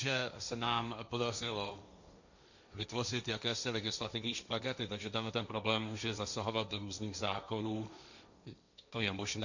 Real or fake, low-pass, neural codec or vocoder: fake; 7.2 kHz; codec, 16 kHz, 1.1 kbps, Voila-Tokenizer